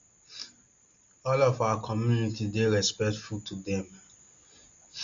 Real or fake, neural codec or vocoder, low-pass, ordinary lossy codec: real; none; 7.2 kHz; Opus, 64 kbps